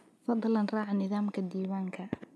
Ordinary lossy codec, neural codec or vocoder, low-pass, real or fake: none; none; none; real